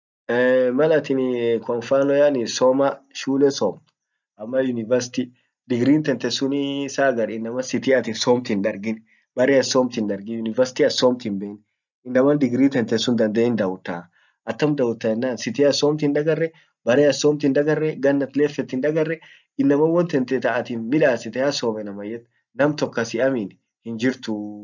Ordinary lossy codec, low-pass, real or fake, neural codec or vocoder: none; 7.2 kHz; real; none